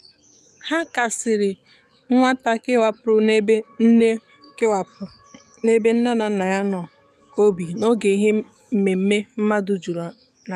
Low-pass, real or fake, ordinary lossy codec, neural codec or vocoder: 14.4 kHz; fake; none; codec, 44.1 kHz, 7.8 kbps, DAC